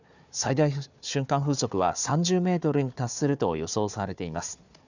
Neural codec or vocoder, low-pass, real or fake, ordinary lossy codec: codec, 16 kHz, 4 kbps, FunCodec, trained on Chinese and English, 50 frames a second; 7.2 kHz; fake; none